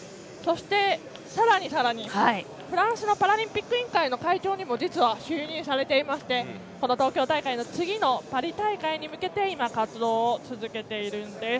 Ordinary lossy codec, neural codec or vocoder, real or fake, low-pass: none; none; real; none